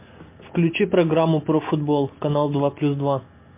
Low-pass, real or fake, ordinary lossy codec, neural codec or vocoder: 3.6 kHz; real; MP3, 24 kbps; none